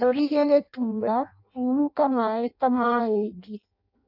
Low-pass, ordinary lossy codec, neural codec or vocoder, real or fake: 5.4 kHz; none; codec, 16 kHz in and 24 kHz out, 0.6 kbps, FireRedTTS-2 codec; fake